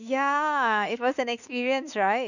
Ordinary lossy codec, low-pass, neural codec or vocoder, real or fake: none; 7.2 kHz; autoencoder, 48 kHz, 32 numbers a frame, DAC-VAE, trained on Japanese speech; fake